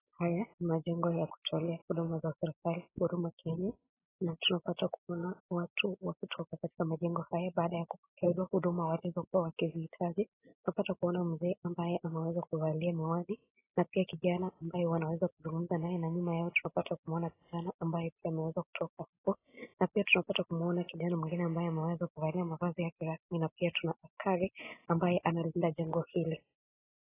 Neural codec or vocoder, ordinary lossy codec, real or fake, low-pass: none; AAC, 16 kbps; real; 3.6 kHz